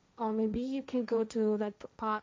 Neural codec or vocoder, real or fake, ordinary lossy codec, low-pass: codec, 16 kHz, 1.1 kbps, Voila-Tokenizer; fake; none; none